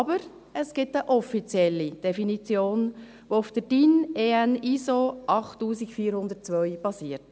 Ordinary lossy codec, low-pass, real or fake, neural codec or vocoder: none; none; real; none